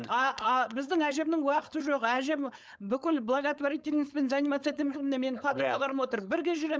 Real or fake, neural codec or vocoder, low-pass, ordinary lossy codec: fake; codec, 16 kHz, 4.8 kbps, FACodec; none; none